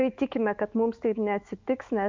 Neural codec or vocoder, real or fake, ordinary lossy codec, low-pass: autoencoder, 48 kHz, 128 numbers a frame, DAC-VAE, trained on Japanese speech; fake; Opus, 24 kbps; 7.2 kHz